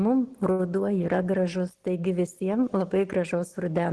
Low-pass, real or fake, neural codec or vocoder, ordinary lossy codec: 10.8 kHz; real; none; Opus, 16 kbps